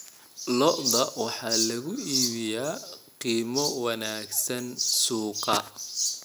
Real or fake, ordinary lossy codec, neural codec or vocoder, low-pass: real; none; none; none